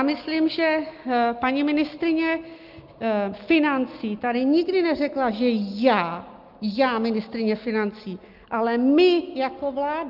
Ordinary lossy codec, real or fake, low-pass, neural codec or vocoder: Opus, 24 kbps; real; 5.4 kHz; none